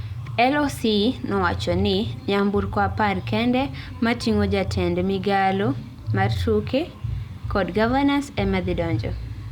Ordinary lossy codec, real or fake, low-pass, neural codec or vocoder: Opus, 64 kbps; real; 19.8 kHz; none